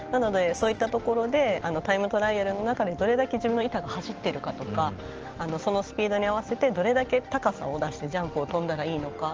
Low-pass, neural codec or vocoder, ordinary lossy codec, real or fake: 7.2 kHz; none; Opus, 16 kbps; real